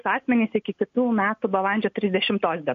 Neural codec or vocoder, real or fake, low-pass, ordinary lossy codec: none; real; 7.2 kHz; MP3, 48 kbps